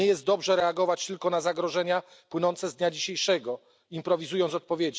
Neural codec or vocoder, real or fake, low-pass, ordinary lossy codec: none; real; none; none